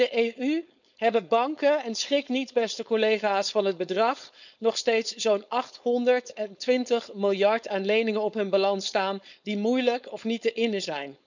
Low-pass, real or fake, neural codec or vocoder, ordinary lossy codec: 7.2 kHz; fake; codec, 16 kHz, 4.8 kbps, FACodec; none